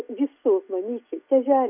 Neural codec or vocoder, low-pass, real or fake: none; 3.6 kHz; real